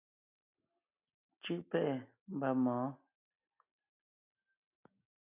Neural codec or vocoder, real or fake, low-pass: none; real; 3.6 kHz